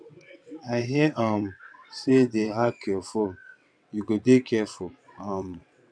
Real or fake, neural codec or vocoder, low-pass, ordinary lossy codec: fake; vocoder, 22.05 kHz, 80 mel bands, WaveNeXt; 9.9 kHz; none